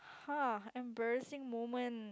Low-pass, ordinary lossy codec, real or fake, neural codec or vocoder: none; none; real; none